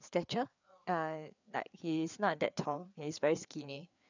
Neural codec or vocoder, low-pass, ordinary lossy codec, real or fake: codec, 16 kHz, 4 kbps, FreqCodec, larger model; 7.2 kHz; none; fake